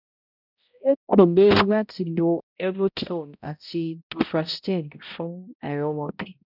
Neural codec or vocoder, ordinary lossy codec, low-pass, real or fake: codec, 16 kHz, 0.5 kbps, X-Codec, HuBERT features, trained on balanced general audio; none; 5.4 kHz; fake